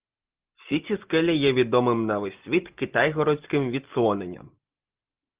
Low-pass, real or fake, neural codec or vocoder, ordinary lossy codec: 3.6 kHz; real; none; Opus, 32 kbps